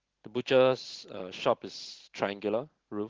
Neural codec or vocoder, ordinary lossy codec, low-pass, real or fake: none; Opus, 16 kbps; 7.2 kHz; real